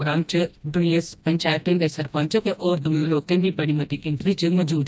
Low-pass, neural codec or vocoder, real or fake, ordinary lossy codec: none; codec, 16 kHz, 1 kbps, FreqCodec, smaller model; fake; none